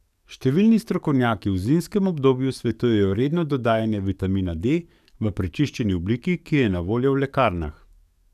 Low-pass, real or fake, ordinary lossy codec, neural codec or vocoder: 14.4 kHz; fake; none; codec, 44.1 kHz, 7.8 kbps, DAC